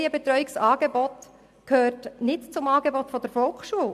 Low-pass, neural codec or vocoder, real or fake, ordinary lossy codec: 14.4 kHz; none; real; MP3, 96 kbps